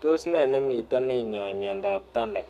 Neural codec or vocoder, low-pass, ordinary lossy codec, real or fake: codec, 32 kHz, 1.9 kbps, SNAC; 14.4 kHz; none; fake